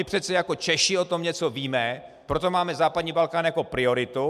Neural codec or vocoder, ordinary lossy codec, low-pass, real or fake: none; MP3, 96 kbps; 14.4 kHz; real